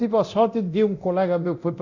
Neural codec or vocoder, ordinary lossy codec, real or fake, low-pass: codec, 24 kHz, 0.9 kbps, DualCodec; none; fake; 7.2 kHz